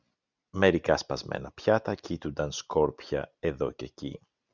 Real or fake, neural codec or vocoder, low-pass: real; none; 7.2 kHz